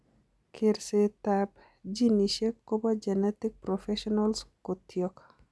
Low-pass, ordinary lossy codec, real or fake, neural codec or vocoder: none; none; real; none